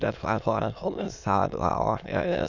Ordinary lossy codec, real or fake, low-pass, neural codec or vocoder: none; fake; 7.2 kHz; autoencoder, 22.05 kHz, a latent of 192 numbers a frame, VITS, trained on many speakers